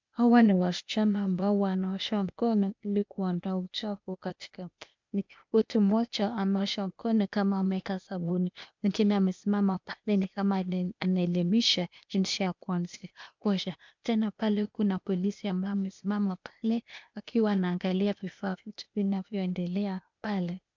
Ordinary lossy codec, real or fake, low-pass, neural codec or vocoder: MP3, 64 kbps; fake; 7.2 kHz; codec, 16 kHz, 0.8 kbps, ZipCodec